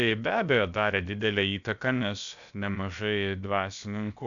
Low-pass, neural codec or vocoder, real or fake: 7.2 kHz; codec, 16 kHz, about 1 kbps, DyCAST, with the encoder's durations; fake